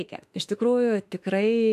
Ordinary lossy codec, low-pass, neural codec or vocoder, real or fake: AAC, 96 kbps; 14.4 kHz; autoencoder, 48 kHz, 32 numbers a frame, DAC-VAE, trained on Japanese speech; fake